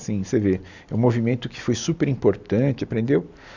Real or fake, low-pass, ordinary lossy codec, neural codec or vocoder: real; 7.2 kHz; none; none